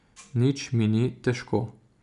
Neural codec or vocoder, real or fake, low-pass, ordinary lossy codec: none; real; 10.8 kHz; none